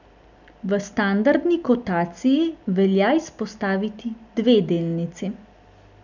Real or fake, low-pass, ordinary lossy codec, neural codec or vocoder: real; 7.2 kHz; none; none